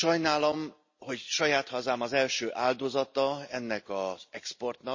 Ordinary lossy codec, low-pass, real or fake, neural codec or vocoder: MP3, 32 kbps; 7.2 kHz; real; none